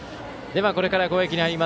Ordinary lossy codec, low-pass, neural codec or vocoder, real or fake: none; none; none; real